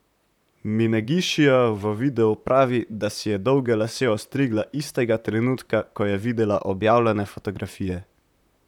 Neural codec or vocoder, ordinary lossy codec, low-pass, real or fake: vocoder, 44.1 kHz, 128 mel bands, Pupu-Vocoder; none; 19.8 kHz; fake